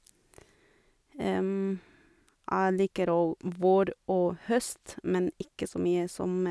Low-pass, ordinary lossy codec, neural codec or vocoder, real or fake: none; none; none; real